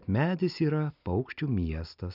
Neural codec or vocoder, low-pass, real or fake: none; 5.4 kHz; real